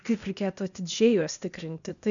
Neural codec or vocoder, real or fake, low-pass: codec, 16 kHz, 0.8 kbps, ZipCodec; fake; 7.2 kHz